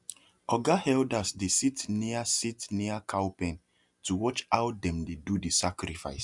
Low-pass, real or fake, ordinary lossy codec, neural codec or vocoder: 10.8 kHz; real; MP3, 96 kbps; none